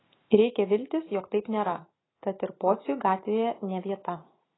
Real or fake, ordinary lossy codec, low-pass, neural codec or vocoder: real; AAC, 16 kbps; 7.2 kHz; none